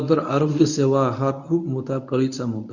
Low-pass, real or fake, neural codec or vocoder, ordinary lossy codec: 7.2 kHz; fake; codec, 24 kHz, 0.9 kbps, WavTokenizer, medium speech release version 1; none